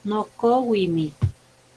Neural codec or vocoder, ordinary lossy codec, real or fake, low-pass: none; Opus, 16 kbps; real; 10.8 kHz